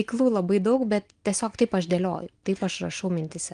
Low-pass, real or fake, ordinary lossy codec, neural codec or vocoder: 9.9 kHz; real; Opus, 24 kbps; none